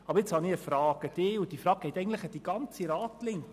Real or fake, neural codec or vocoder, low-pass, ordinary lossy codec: real; none; 14.4 kHz; none